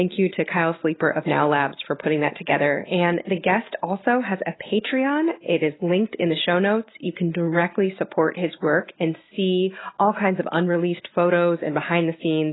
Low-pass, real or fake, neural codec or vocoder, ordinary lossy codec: 7.2 kHz; real; none; AAC, 16 kbps